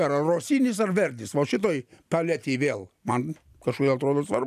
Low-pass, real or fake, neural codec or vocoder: 14.4 kHz; real; none